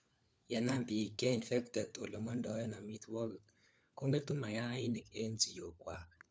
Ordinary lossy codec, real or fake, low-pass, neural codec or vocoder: none; fake; none; codec, 16 kHz, 4 kbps, FunCodec, trained on LibriTTS, 50 frames a second